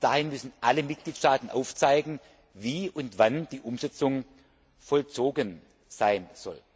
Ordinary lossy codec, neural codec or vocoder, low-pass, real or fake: none; none; none; real